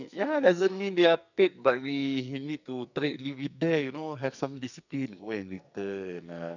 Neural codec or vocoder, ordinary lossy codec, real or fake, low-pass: codec, 44.1 kHz, 2.6 kbps, SNAC; none; fake; 7.2 kHz